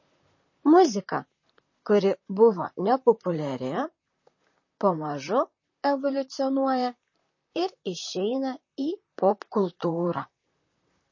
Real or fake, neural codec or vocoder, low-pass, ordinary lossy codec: fake; vocoder, 44.1 kHz, 128 mel bands, Pupu-Vocoder; 7.2 kHz; MP3, 32 kbps